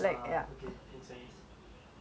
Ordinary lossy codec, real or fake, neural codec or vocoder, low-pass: none; real; none; none